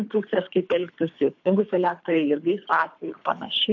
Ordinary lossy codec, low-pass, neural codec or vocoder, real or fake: MP3, 48 kbps; 7.2 kHz; codec, 24 kHz, 3 kbps, HILCodec; fake